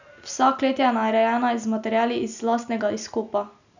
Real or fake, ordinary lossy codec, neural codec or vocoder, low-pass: real; none; none; 7.2 kHz